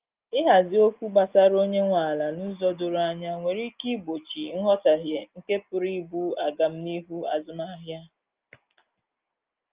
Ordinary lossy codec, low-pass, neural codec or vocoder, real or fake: Opus, 32 kbps; 3.6 kHz; none; real